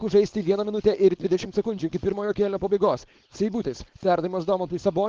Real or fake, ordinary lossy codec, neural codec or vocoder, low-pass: fake; Opus, 16 kbps; codec, 16 kHz, 4.8 kbps, FACodec; 7.2 kHz